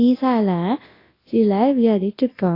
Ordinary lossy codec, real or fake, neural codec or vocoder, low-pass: AAC, 24 kbps; fake; codec, 24 kHz, 0.9 kbps, WavTokenizer, large speech release; 5.4 kHz